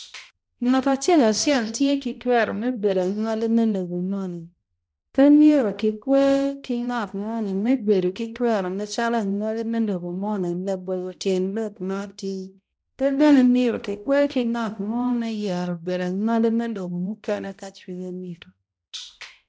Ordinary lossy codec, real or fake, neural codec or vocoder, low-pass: none; fake; codec, 16 kHz, 0.5 kbps, X-Codec, HuBERT features, trained on balanced general audio; none